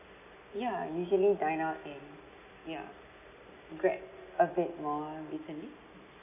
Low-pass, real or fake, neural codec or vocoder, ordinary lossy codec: 3.6 kHz; real; none; none